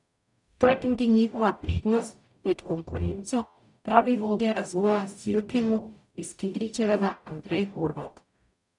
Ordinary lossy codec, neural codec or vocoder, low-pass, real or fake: none; codec, 44.1 kHz, 0.9 kbps, DAC; 10.8 kHz; fake